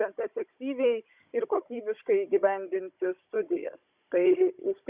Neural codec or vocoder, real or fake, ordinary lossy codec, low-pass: codec, 16 kHz, 16 kbps, FunCodec, trained on Chinese and English, 50 frames a second; fake; Opus, 64 kbps; 3.6 kHz